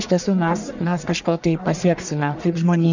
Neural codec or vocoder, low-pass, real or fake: codec, 44.1 kHz, 1.7 kbps, Pupu-Codec; 7.2 kHz; fake